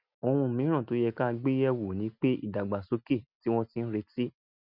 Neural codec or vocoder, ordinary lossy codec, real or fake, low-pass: none; none; real; 5.4 kHz